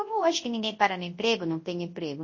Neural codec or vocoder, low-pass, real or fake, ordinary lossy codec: codec, 24 kHz, 0.9 kbps, WavTokenizer, large speech release; 7.2 kHz; fake; MP3, 32 kbps